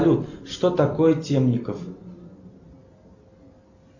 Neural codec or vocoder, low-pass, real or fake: none; 7.2 kHz; real